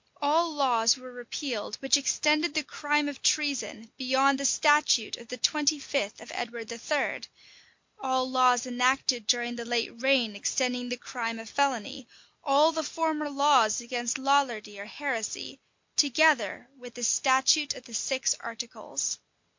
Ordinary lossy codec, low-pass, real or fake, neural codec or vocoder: MP3, 48 kbps; 7.2 kHz; real; none